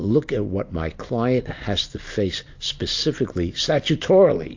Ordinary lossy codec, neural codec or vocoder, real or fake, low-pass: AAC, 48 kbps; none; real; 7.2 kHz